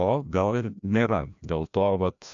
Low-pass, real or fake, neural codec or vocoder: 7.2 kHz; fake; codec, 16 kHz, 1 kbps, FreqCodec, larger model